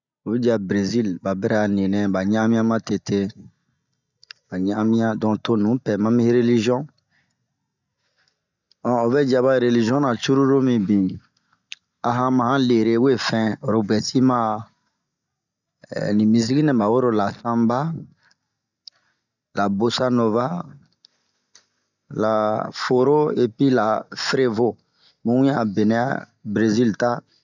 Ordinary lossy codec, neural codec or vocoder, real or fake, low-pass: none; none; real; 7.2 kHz